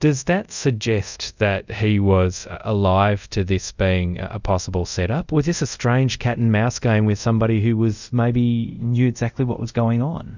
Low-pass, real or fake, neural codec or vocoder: 7.2 kHz; fake; codec, 24 kHz, 0.5 kbps, DualCodec